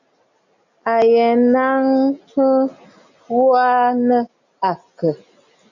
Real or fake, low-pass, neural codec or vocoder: real; 7.2 kHz; none